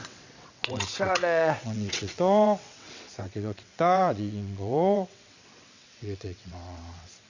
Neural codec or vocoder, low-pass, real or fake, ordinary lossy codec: vocoder, 22.05 kHz, 80 mel bands, WaveNeXt; 7.2 kHz; fake; Opus, 64 kbps